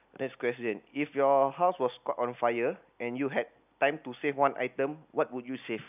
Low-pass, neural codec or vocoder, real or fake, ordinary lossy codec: 3.6 kHz; none; real; none